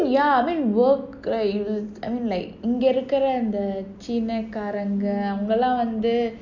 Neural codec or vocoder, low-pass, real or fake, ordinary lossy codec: none; 7.2 kHz; real; none